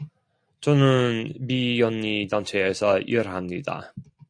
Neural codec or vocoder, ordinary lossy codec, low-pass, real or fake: none; AAC, 64 kbps; 9.9 kHz; real